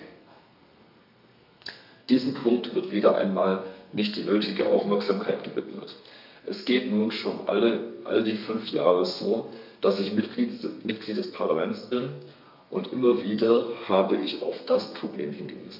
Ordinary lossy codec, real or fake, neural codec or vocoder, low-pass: MP3, 48 kbps; fake; codec, 32 kHz, 1.9 kbps, SNAC; 5.4 kHz